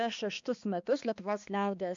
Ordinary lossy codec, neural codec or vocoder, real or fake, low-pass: MP3, 64 kbps; codec, 16 kHz, 2 kbps, X-Codec, HuBERT features, trained on balanced general audio; fake; 7.2 kHz